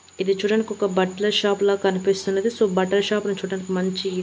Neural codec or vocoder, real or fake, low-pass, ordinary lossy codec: none; real; none; none